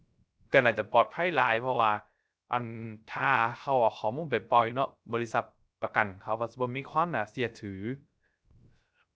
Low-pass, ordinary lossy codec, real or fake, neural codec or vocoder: none; none; fake; codec, 16 kHz, 0.3 kbps, FocalCodec